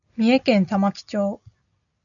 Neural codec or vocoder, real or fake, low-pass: none; real; 7.2 kHz